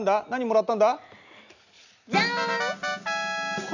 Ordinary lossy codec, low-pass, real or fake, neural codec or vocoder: none; 7.2 kHz; real; none